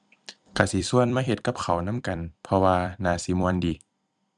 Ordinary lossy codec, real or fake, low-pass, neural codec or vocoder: none; fake; 10.8 kHz; vocoder, 44.1 kHz, 128 mel bands every 256 samples, BigVGAN v2